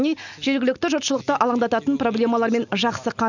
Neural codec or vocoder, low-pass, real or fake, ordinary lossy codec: none; 7.2 kHz; real; none